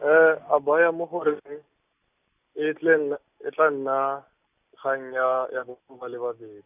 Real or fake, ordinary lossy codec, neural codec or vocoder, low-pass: real; none; none; 3.6 kHz